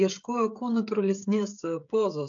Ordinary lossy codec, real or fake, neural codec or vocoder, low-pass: AAC, 64 kbps; fake; codec, 16 kHz, 16 kbps, FreqCodec, smaller model; 7.2 kHz